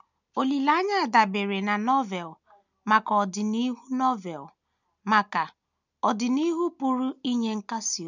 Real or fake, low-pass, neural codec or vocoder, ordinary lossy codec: real; 7.2 kHz; none; none